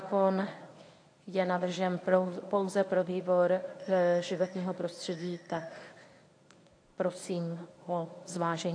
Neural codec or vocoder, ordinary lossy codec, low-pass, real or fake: codec, 24 kHz, 0.9 kbps, WavTokenizer, medium speech release version 1; AAC, 48 kbps; 9.9 kHz; fake